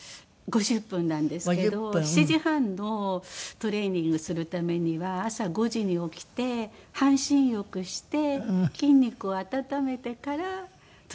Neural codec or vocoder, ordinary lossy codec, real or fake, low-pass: none; none; real; none